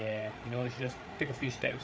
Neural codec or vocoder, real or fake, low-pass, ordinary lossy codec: codec, 16 kHz, 8 kbps, FreqCodec, larger model; fake; none; none